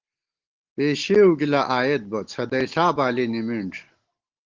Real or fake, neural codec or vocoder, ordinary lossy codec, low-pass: real; none; Opus, 16 kbps; 7.2 kHz